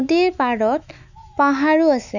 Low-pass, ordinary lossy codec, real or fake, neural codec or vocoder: 7.2 kHz; none; fake; autoencoder, 48 kHz, 128 numbers a frame, DAC-VAE, trained on Japanese speech